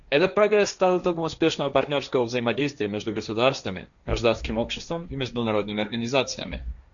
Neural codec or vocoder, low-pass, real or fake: codec, 16 kHz, 1.1 kbps, Voila-Tokenizer; 7.2 kHz; fake